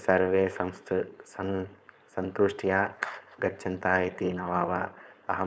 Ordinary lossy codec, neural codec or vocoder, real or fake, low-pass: none; codec, 16 kHz, 4.8 kbps, FACodec; fake; none